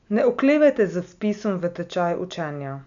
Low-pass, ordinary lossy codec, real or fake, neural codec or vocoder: 7.2 kHz; none; real; none